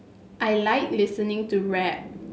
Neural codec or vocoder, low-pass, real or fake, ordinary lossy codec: none; none; real; none